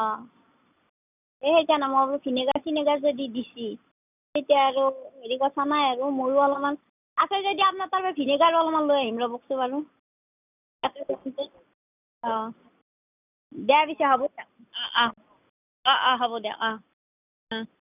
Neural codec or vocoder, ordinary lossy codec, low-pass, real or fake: none; none; 3.6 kHz; real